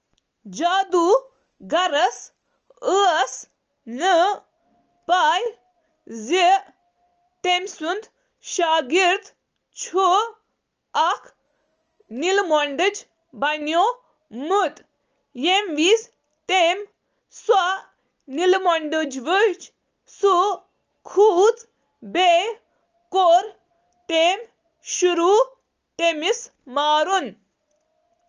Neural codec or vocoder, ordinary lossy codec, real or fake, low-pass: none; Opus, 24 kbps; real; 7.2 kHz